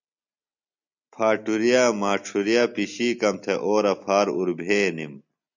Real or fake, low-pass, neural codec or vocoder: real; 7.2 kHz; none